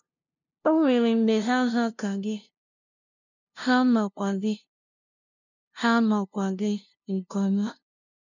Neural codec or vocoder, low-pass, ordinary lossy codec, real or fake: codec, 16 kHz, 0.5 kbps, FunCodec, trained on LibriTTS, 25 frames a second; 7.2 kHz; none; fake